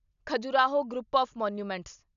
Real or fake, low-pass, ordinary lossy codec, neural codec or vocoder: real; 7.2 kHz; none; none